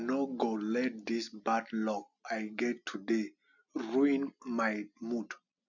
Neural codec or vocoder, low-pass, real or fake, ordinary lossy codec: none; 7.2 kHz; real; none